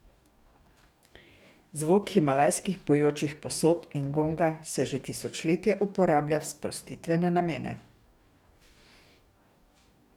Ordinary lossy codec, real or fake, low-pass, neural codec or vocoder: none; fake; 19.8 kHz; codec, 44.1 kHz, 2.6 kbps, DAC